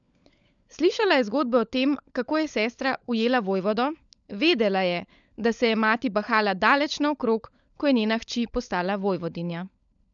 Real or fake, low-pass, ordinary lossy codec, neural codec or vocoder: fake; 7.2 kHz; none; codec, 16 kHz, 16 kbps, FunCodec, trained on LibriTTS, 50 frames a second